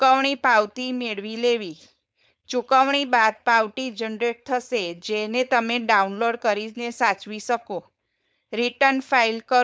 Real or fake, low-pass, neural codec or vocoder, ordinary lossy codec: fake; none; codec, 16 kHz, 4.8 kbps, FACodec; none